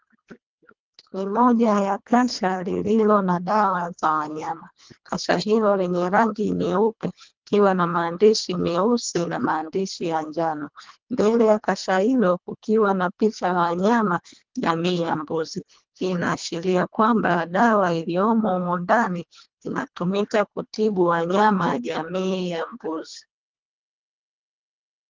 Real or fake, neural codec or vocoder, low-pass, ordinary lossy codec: fake; codec, 24 kHz, 1.5 kbps, HILCodec; 7.2 kHz; Opus, 24 kbps